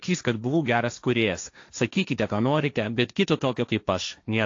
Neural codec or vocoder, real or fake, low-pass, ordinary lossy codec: codec, 16 kHz, 1.1 kbps, Voila-Tokenizer; fake; 7.2 kHz; AAC, 48 kbps